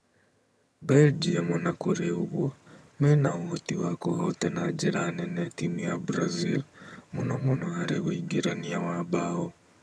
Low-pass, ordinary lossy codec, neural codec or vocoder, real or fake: none; none; vocoder, 22.05 kHz, 80 mel bands, HiFi-GAN; fake